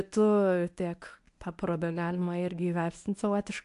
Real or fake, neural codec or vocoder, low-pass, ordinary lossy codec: fake; codec, 24 kHz, 0.9 kbps, WavTokenizer, medium speech release version 1; 10.8 kHz; AAC, 64 kbps